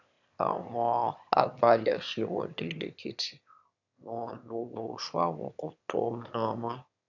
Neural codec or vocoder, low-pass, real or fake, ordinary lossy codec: autoencoder, 22.05 kHz, a latent of 192 numbers a frame, VITS, trained on one speaker; 7.2 kHz; fake; none